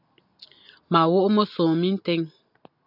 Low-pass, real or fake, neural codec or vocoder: 5.4 kHz; real; none